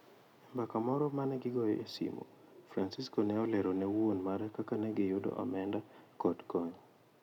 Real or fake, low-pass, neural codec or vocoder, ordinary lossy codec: real; 19.8 kHz; none; none